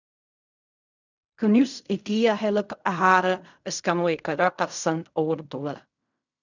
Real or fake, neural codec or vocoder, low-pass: fake; codec, 16 kHz in and 24 kHz out, 0.4 kbps, LongCat-Audio-Codec, fine tuned four codebook decoder; 7.2 kHz